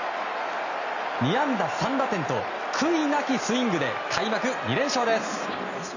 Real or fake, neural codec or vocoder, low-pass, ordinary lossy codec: real; none; 7.2 kHz; none